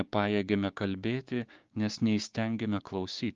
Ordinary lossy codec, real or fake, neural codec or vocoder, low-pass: Opus, 32 kbps; fake; codec, 16 kHz, 6 kbps, DAC; 7.2 kHz